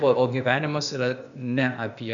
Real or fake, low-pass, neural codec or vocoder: fake; 7.2 kHz; codec, 16 kHz, 0.8 kbps, ZipCodec